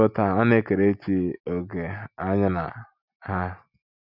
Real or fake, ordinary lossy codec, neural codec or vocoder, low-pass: real; none; none; 5.4 kHz